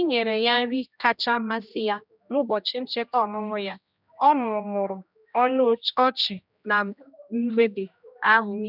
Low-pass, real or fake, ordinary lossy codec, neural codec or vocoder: 5.4 kHz; fake; none; codec, 16 kHz, 1 kbps, X-Codec, HuBERT features, trained on general audio